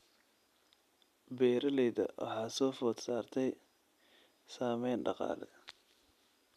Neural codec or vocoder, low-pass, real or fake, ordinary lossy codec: none; 14.4 kHz; real; none